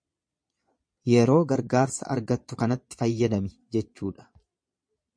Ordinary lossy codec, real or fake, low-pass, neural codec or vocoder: MP3, 48 kbps; fake; 9.9 kHz; vocoder, 22.05 kHz, 80 mel bands, Vocos